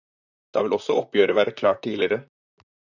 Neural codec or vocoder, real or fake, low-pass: vocoder, 44.1 kHz, 128 mel bands, Pupu-Vocoder; fake; 7.2 kHz